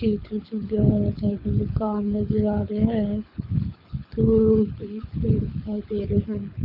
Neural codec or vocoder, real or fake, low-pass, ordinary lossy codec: codec, 24 kHz, 6 kbps, HILCodec; fake; 5.4 kHz; none